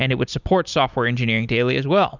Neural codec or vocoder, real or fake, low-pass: none; real; 7.2 kHz